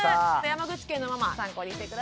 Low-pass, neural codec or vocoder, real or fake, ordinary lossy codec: none; none; real; none